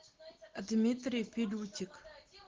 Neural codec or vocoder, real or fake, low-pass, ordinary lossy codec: none; real; 7.2 kHz; Opus, 16 kbps